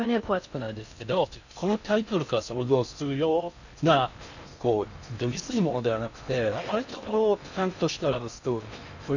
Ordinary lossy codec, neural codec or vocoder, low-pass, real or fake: none; codec, 16 kHz in and 24 kHz out, 0.6 kbps, FocalCodec, streaming, 2048 codes; 7.2 kHz; fake